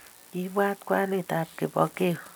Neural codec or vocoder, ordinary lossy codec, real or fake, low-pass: none; none; real; none